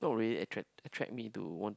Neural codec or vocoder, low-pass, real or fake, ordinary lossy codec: none; none; real; none